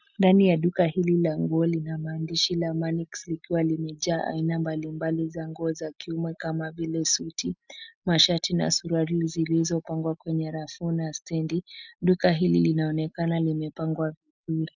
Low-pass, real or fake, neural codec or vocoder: 7.2 kHz; real; none